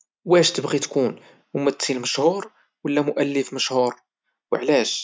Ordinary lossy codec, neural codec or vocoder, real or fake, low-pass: none; none; real; none